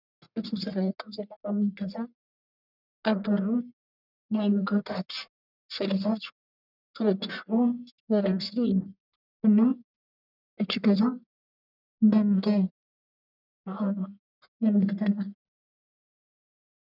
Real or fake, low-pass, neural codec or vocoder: fake; 5.4 kHz; codec, 44.1 kHz, 1.7 kbps, Pupu-Codec